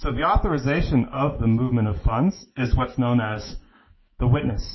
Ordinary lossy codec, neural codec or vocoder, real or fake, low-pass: MP3, 24 kbps; autoencoder, 48 kHz, 128 numbers a frame, DAC-VAE, trained on Japanese speech; fake; 7.2 kHz